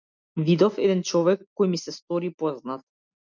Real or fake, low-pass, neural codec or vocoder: real; 7.2 kHz; none